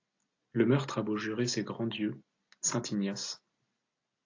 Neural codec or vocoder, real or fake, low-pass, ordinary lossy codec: none; real; 7.2 kHz; AAC, 48 kbps